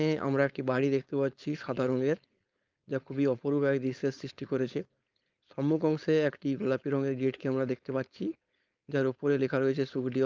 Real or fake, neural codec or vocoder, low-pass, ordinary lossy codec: fake; codec, 16 kHz, 4.8 kbps, FACodec; 7.2 kHz; Opus, 24 kbps